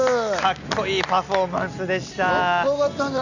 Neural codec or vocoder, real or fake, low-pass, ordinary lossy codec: none; real; 7.2 kHz; none